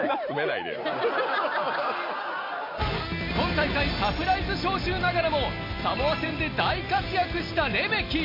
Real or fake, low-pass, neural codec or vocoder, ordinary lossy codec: real; 5.4 kHz; none; MP3, 32 kbps